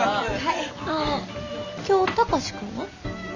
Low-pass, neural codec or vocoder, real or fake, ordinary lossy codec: 7.2 kHz; none; real; none